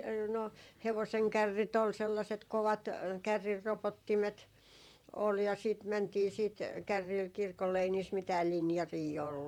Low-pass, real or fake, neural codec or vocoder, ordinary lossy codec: 19.8 kHz; fake; vocoder, 44.1 kHz, 128 mel bands, Pupu-Vocoder; MP3, 96 kbps